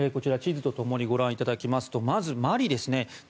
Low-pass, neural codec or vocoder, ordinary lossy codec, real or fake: none; none; none; real